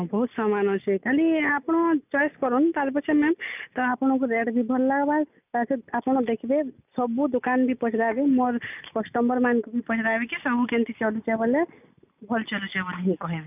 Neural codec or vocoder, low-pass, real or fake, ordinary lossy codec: none; 3.6 kHz; real; none